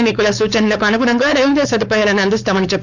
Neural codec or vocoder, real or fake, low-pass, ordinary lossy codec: codec, 16 kHz, 4.8 kbps, FACodec; fake; 7.2 kHz; none